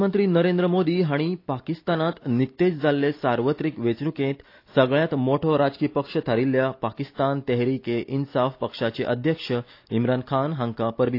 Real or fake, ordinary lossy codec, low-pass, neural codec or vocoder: real; AAC, 32 kbps; 5.4 kHz; none